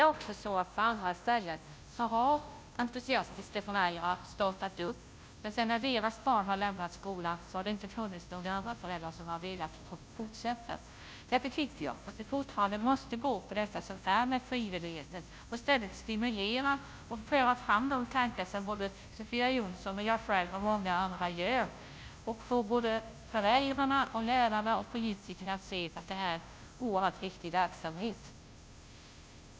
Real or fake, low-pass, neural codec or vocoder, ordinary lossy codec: fake; none; codec, 16 kHz, 0.5 kbps, FunCodec, trained on Chinese and English, 25 frames a second; none